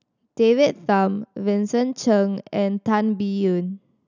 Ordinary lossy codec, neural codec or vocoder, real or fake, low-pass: none; none; real; 7.2 kHz